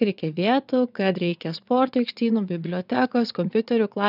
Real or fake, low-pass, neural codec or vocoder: fake; 5.4 kHz; vocoder, 22.05 kHz, 80 mel bands, WaveNeXt